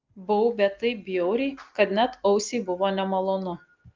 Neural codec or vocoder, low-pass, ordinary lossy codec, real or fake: none; 7.2 kHz; Opus, 24 kbps; real